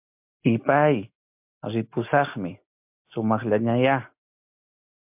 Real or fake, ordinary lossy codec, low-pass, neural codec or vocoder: real; MP3, 32 kbps; 3.6 kHz; none